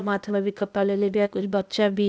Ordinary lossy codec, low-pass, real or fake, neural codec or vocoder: none; none; fake; codec, 16 kHz, 0.8 kbps, ZipCodec